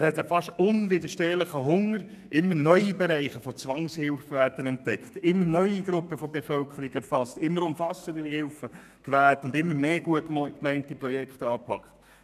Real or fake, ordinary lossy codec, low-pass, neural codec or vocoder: fake; none; 14.4 kHz; codec, 32 kHz, 1.9 kbps, SNAC